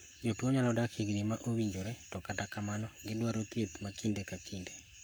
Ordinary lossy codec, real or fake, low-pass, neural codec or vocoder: none; fake; none; codec, 44.1 kHz, 7.8 kbps, Pupu-Codec